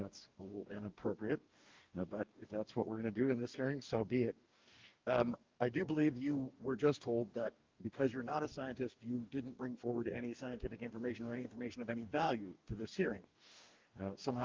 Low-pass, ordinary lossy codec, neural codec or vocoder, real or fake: 7.2 kHz; Opus, 32 kbps; codec, 44.1 kHz, 2.6 kbps, DAC; fake